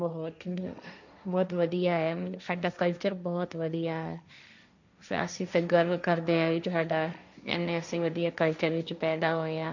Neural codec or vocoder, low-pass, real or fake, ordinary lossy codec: codec, 16 kHz, 1.1 kbps, Voila-Tokenizer; 7.2 kHz; fake; none